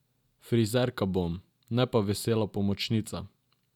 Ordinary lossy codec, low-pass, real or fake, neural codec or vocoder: none; 19.8 kHz; real; none